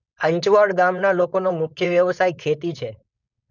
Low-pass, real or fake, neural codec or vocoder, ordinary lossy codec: 7.2 kHz; fake; codec, 16 kHz, 4.8 kbps, FACodec; none